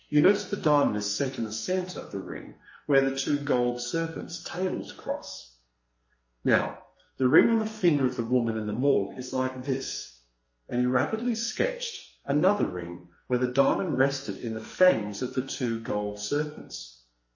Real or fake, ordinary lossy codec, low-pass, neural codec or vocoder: fake; MP3, 32 kbps; 7.2 kHz; codec, 44.1 kHz, 2.6 kbps, SNAC